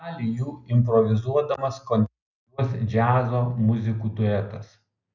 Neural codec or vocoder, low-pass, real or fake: none; 7.2 kHz; real